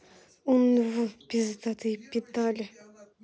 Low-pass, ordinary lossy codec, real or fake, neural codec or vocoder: none; none; real; none